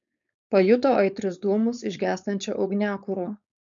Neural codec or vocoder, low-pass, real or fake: codec, 16 kHz, 4.8 kbps, FACodec; 7.2 kHz; fake